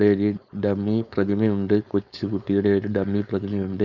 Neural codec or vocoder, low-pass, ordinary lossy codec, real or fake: codec, 16 kHz, 4.8 kbps, FACodec; 7.2 kHz; none; fake